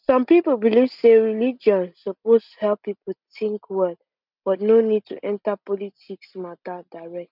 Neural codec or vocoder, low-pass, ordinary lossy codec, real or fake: none; 5.4 kHz; none; real